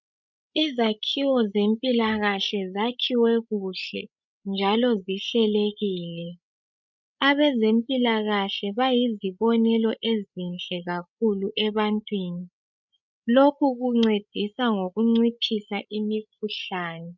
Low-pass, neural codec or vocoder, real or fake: 7.2 kHz; codec, 16 kHz, 16 kbps, FreqCodec, larger model; fake